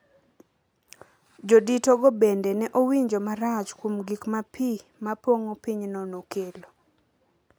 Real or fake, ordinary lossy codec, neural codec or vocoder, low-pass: real; none; none; none